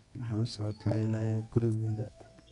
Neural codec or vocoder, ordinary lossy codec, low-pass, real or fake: codec, 24 kHz, 0.9 kbps, WavTokenizer, medium music audio release; none; 10.8 kHz; fake